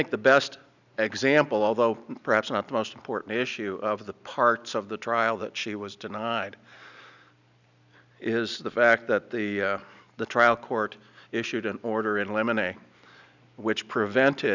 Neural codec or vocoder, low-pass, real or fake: none; 7.2 kHz; real